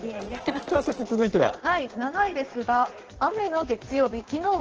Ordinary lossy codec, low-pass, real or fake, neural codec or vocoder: Opus, 16 kbps; 7.2 kHz; fake; codec, 16 kHz in and 24 kHz out, 1.1 kbps, FireRedTTS-2 codec